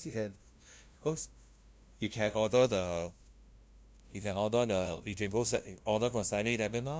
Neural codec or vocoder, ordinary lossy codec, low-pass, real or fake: codec, 16 kHz, 0.5 kbps, FunCodec, trained on LibriTTS, 25 frames a second; none; none; fake